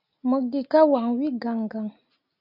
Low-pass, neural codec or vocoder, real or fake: 5.4 kHz; none; real